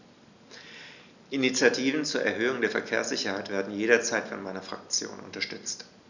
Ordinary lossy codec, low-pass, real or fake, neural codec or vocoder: none; 7.2 kHz; real; none